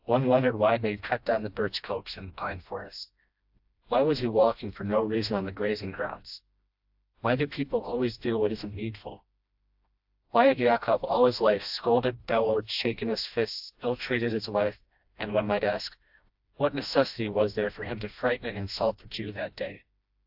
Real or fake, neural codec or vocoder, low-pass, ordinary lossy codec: fake; codec, 16 kHz, 1 kbps, FreqCodec, smaller model; 5.4 kHz; AAC, 48 kbps